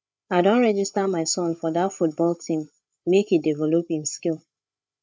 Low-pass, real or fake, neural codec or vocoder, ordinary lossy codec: none; fake; codec, 16 kHz, 8 kbps, FreqCodec, larger model; none